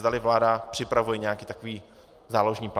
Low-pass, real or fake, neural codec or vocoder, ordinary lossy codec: 14.4 kHz; real; none; Opus, 32 kbps